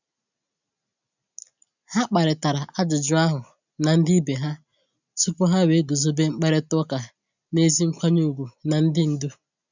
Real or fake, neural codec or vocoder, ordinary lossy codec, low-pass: real; none; none; 7.2 kHz